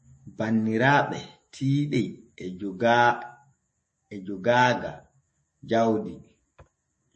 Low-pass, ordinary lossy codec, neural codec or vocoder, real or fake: 10.8 kHz; MP3, 32 kbps; autoencoder, 48 kHz, 128 numbers a frame, DAC-VAE, trained on Japanese speech; fake